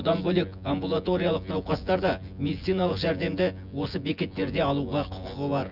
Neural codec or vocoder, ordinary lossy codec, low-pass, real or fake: vocoder, 24 kHz, 100 mel bands, Vocos; none; 5.4 kHz; fake